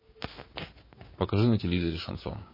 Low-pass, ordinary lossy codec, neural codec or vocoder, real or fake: 5.4 kHz; MP3, 24 kbps; codec, 16 kHz, 6 kbps, DAC; fake